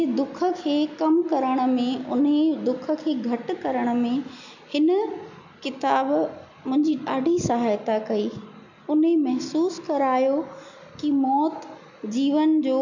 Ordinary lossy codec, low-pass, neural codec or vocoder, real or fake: none; 7.2 kHz; none; real